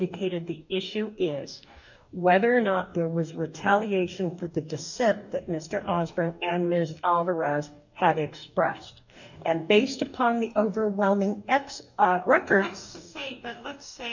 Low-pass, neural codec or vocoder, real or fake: 7.2 kHz; codec, 44.1 kHz, 2.6 kbps, DAC; fake